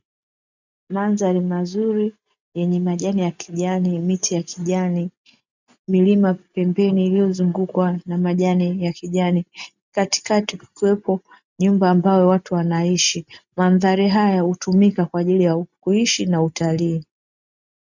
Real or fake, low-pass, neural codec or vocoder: real; 7.2 kHz; none